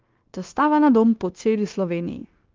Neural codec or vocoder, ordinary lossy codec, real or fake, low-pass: codec, 16 kHz, 0.9 kbps, LongCat-Audio-Codec; Opus, 32 kbps; fake; 7.2 kHz